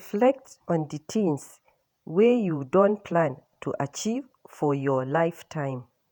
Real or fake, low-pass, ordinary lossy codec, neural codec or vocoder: fake; none; none; vocoder, 48 kHz, 128 mel bands, Vocos